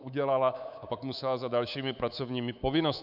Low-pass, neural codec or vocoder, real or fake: 5.4 kHz; codec, 24 kHz, 3.1 kbps, DualCodec; fake